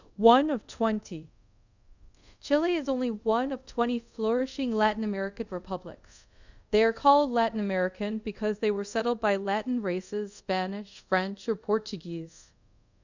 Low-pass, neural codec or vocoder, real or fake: 7.2 kHz; codec, 24 kHz, 0.5 kbps, DualCodec; fake